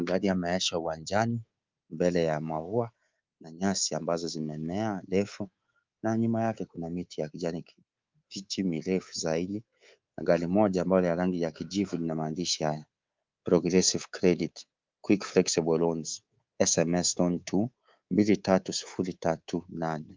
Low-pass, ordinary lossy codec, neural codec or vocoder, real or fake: 7.2 kHz; Opus, 24 kbps; codec, 24 kHz, 3.1 kbps, DualCodec; fake